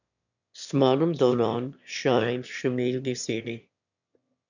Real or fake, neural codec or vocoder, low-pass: fake; autoencoder, 22.05 kHz, a latent of 192 numbers a frame, VITS, trained on one speaker; 7.2 kHz